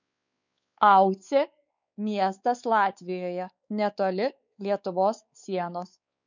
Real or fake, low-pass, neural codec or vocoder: fake; 7.2 kHz; codec, 16 kHz, 4 kbps, X-Codec, WavLM features, trained on Multilingual LibriSpeech